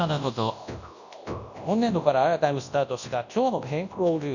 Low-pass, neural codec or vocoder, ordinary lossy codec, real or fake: 7.2 kHz; codec, 24 kHz, 0.9 kbps, WavTokenizer, large speech release; none; fake